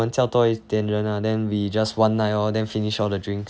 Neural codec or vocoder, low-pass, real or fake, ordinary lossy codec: none; none; real; none